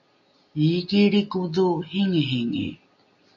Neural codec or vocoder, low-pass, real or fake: none; 7.2 kHz; real